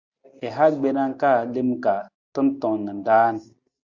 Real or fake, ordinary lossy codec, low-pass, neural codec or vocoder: real; Opus, 64 kbps; 7.2 kHz; none